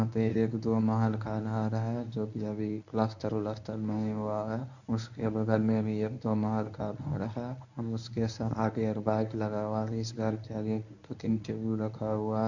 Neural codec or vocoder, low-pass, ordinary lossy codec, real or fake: codec, 24 kHz, 0.9 kbps, WavTokenizer, medium speech release version 1; 7.2 kHz; none; fake